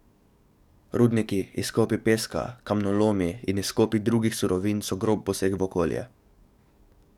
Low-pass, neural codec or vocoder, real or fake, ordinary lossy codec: 19.8 kHz; codec, 44.1 kHz, 7.8 kbps, DAC; fake; none